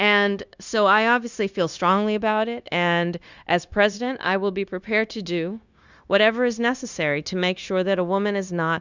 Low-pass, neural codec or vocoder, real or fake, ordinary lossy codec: 7.2 kHz; codec, 16 kHz, 0.9 kbps, LongCat-Audio-Codec; fake; Opus, 64 kbps